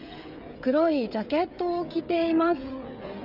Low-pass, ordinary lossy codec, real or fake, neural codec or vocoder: 5.4 kHz; none; fake; codec, 16 kHz, 16 kbps, FreqCodec, smaller model